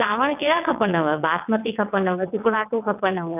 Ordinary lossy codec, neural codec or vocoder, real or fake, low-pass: none; vocoder, 22.05 kHz, 80 mel bands, WaveNeXt; fake; 3.6 kHz